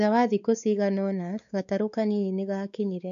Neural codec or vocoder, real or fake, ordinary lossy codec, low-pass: codec, 16 kHz, 4 kbps, X-Codec, WavLM features, trained on Multilingual LibriSpeech; fake; MP3, 96 kbps; 7.2 kHz